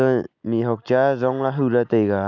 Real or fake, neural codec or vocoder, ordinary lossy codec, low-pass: real; none; none; 7.2 kHz